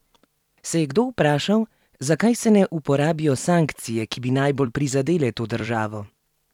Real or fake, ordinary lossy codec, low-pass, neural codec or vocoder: fake; none; 19.8 kHz; vocoder, 44.1 kHz, 128 mel bands every 256 samples, BigVGAN v2